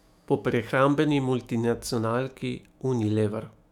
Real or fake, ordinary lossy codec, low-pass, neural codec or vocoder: fake; none; 19.8 kHz; autoencoder, 48 kHz, 128 numbers a frame, DAC-VAE, trained on Japanese speech